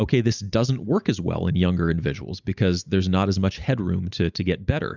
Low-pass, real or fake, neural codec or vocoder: 7.2 kHz; real; none